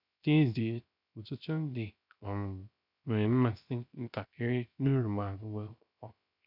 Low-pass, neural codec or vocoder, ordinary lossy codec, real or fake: 5.4 kHz; codec, 16 kHz, 0.3 kbps, FocalCodec; none; fake